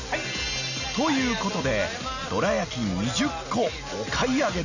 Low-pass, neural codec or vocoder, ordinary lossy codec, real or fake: 7.2 kHz; none; none; real